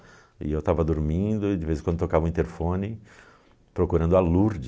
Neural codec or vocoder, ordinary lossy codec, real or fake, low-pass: none; none; real; none